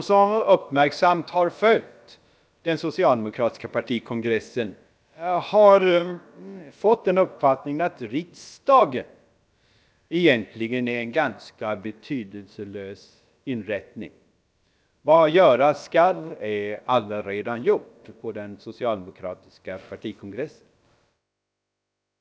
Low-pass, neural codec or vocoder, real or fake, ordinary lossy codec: none; codec, 16 kHz, about 1 kbps, DyCAST, with the encoder's durations; fake; none